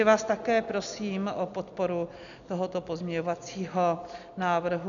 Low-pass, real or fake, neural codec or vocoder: 7.2 kHz; real; none